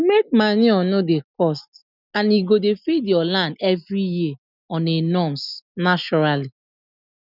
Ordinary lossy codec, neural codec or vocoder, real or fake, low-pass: none; none; real; 5.4 kHz